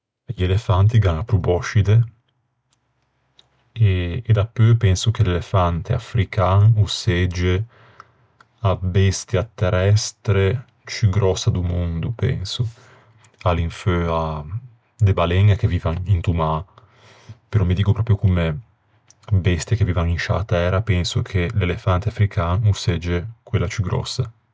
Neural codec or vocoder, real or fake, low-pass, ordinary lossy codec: none; real; none; none